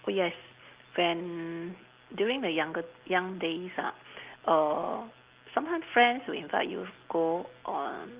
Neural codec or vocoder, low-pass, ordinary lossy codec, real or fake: none; 3.6 kHz; Opus, 32 kbps; real